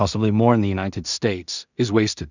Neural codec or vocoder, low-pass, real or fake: codec, 16 kHz in and 24 kHz out, 0.4 kbps, LongCat-Audio-Codec, two codebook decoder; 7.2 kHz; fake